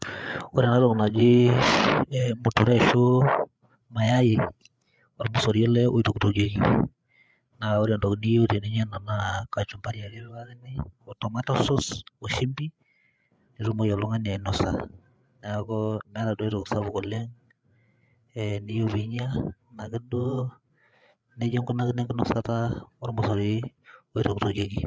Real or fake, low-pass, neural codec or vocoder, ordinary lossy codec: fake; none; codec, 16 kHz, 8 kbps, FreqCodec, larger model; none